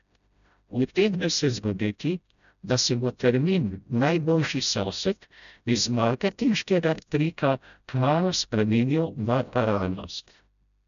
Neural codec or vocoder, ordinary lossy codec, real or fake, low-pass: codec, 16 kHz, 0.5 kbps, FreqCodec, smaller model; none; fake; 7.2 kHz